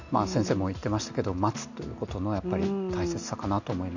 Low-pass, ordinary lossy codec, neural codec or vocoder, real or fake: 7.2 kHz; none; none; real